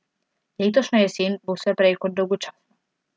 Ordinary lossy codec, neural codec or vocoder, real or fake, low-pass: none; none; real; none